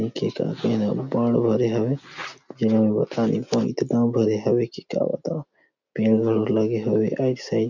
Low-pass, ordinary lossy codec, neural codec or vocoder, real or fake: 7.2 kHz; none; none; real